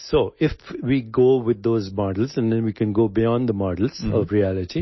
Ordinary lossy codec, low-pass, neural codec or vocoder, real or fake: MP3, 24 kbps; 7.2 kHz; none; real